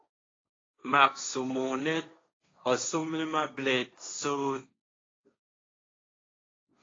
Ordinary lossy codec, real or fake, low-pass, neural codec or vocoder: AAC, 32 kbps; fake; 7.2 kHz; codec, 16 kHz, 1.1 kbps, Voila-Tokenizer